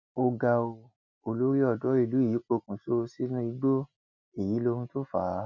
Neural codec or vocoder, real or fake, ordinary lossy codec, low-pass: none; real; MP3, 48 kbps; 7.2 kHz